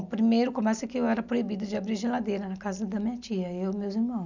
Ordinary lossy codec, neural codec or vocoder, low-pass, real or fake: none; none; 7.2 kHz; real